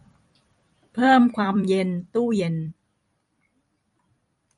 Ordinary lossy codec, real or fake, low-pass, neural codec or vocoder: MP3, 48 kbps; fake; 19.8 kHz; vocoder, 44.1 kHz, 128 mel bands every 256 samples, BigVGAN v2